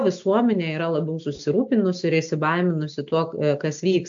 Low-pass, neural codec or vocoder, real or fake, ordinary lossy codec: 7.2 kHz; none; real; AAC, 64 kbps